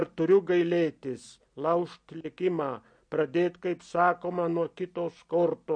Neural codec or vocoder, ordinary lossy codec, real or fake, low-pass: none; MP3, 48 kbps; real; 9.9 kHz